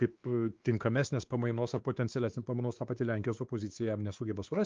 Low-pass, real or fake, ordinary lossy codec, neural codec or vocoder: 7.2 kHz; fake; Opus, 24 kbps; codec, 16 kHz, 2 kbps, X-Codec, WavLM features, trained on Multilingual LibriSpeech